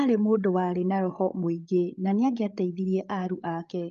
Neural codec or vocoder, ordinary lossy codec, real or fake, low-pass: codec, 16 kHz, 8 kbps, FreqCodec, larger model; Opus, 32 kbps; fake; 7.2 kHz